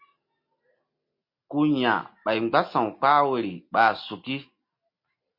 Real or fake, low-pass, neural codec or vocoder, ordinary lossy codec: real; 5.4 kHz; none; MP3, 32 kbps